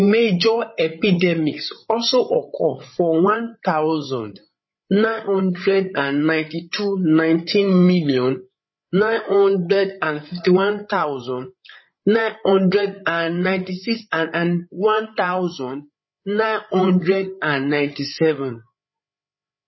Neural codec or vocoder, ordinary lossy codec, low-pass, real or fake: codec, 16 kHz, 8 kbps, FreqCodec, larger model; MP3, 24 kbps; 7.2 kHz; fake